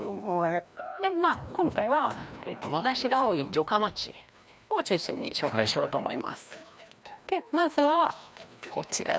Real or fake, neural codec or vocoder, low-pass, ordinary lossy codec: fake; codec, 16 kHz, 1 kbps, FreqCodec, larger model; none; none